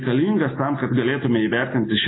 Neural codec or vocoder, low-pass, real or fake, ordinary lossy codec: none; 7.2 kHz; real; AAC, 16 kbps